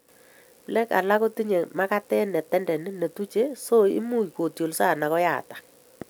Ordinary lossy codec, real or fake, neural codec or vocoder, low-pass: none; real; none; none